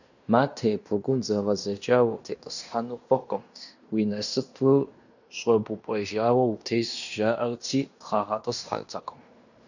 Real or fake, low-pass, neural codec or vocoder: fake; 7.2 kHz; codec, 16 kHz in and 24 kHz out, 0.9 kbps, LongCat-Audio-Codec, fine tuned four codebook decoder